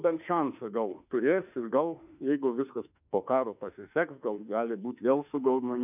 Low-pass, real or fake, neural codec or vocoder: 3.6 kHz; fake; autoencoder, 48 kHz, 32 numbers a frame, DAC-VAE, trained on Japanese speech